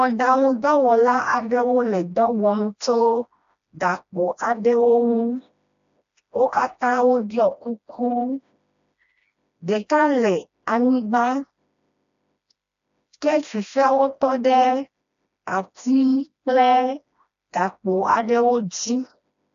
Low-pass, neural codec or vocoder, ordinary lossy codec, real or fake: 7.2 kHz; codec, 16 kHz, 1 kbps, FreqCodec, smaller model; AAC, 96 kbps; fake